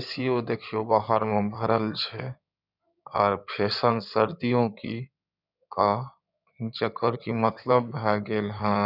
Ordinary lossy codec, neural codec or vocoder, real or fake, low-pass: none; codec, 16 kHz in and 24 kHz out, 2.2 kbps, FireRedTTS-2 codec; fake; 5.4 kHz